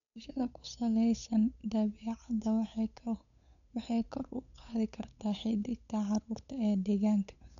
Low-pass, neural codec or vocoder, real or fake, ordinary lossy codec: 7.2 kHz; codec, 16 kHz, 8 kbps, FunCodec, trained on Chinese and English, 25 frames a second; fake; none